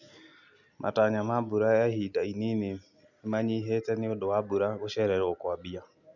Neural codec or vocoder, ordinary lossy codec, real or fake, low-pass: none; none; real; 7.2 kHz